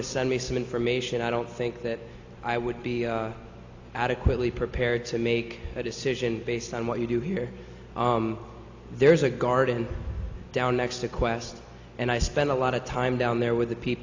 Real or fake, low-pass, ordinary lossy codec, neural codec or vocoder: real; 7.2 kHz; AAC, 48 kbps; none